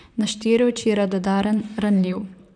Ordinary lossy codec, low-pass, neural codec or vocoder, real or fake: none; 9.9 kHz; vocoder, 44.1 kHz, 128 mel bands, Pupu-Vocoder; fake